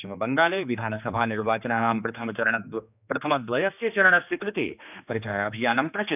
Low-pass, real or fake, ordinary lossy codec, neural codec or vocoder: 3.6 kHz; fake; none; codec, 16 kHz, 2 kbps, X-Codec, HuBERT features, trained on general audio